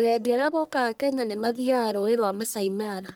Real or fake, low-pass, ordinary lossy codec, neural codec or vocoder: fake; none; none; codec, 44.1 kHz, 1.7 kbps, Pupu-Codec